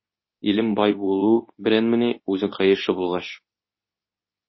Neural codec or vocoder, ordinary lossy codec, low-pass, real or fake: codec, 24 kHz, 0.9 kbps, WavTokenizer, medium speech release version 2; MP3, 24 kbps; 7.2 kHz; fake